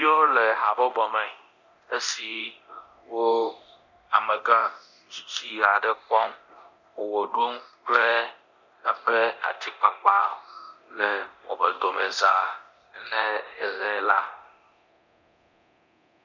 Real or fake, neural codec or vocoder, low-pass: fake; codec, 24 kHz, 0.9 kbps, DualCodec; 7.2 kHz